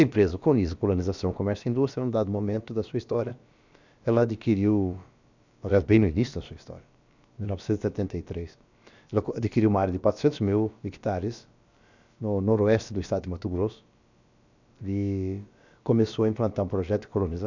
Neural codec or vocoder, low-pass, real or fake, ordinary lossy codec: codec, 16 kHz, about 1 kbps, DyCAST, with the encoder's durations; 7.2 kHz; fake; none